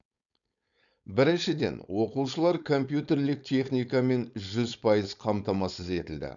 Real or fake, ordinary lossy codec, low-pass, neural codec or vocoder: fake; none; 7.2 kHz; codec, 16 kHz, 4.8 kbps, FACodec